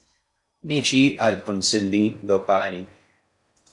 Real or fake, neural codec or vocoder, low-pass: fake; codec, 16 kHz in and 24 kHz out, 0.6 kbps, FocalCodec, streaming, 4096 codes; 10.8 kHz